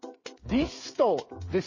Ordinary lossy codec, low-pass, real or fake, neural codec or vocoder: MP3, 32 kbps; 7.2 kHz; fake; codec, 16 kHz, 8 kbps, FreqCodec, smaller model